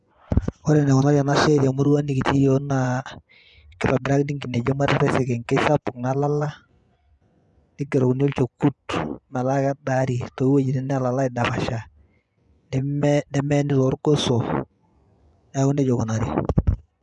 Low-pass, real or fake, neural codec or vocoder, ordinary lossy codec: 10.8 kHz; fake; vocoder, 24 kHz, 100 mel bands, Vocos; none